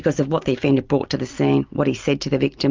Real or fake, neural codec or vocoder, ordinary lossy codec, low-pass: real; none; Opus, 24 kbps; 7.2 kHz